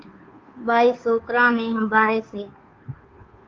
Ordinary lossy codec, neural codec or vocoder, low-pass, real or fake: Opus, 24 kbps; codec, 16 kHz, 2 kbps, FunCodec, trained on Chinese and English, 25 frames a second; 7.2 kHz; fake